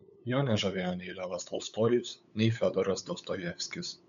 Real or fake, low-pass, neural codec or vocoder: fake; 7.2 kHz; codec, 16 kHz, 8 kbps, FunCodec, trained on LibriTTS, 25 frames a second